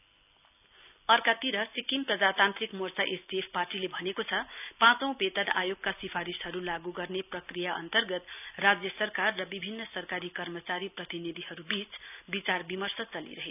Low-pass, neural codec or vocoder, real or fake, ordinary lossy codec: 3.6 kHz; none; real; none